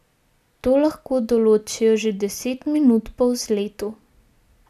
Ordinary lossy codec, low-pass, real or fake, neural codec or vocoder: none; 14.4 kHz; real; none